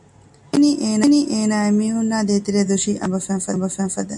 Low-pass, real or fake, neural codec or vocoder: 10.8 kHz; real; none